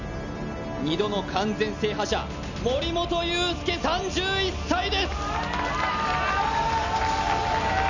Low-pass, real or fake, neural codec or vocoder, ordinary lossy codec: 7.2 kHz; real; none; none